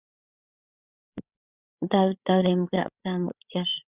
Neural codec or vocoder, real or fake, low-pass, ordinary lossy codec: codec, 16 kHz, 8 kbps, FreqCodec, larger model; fake; 3.6 kHz; Opus, 64 kbps